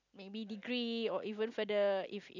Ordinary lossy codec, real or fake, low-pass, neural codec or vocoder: none; real; 7.2 kHz; none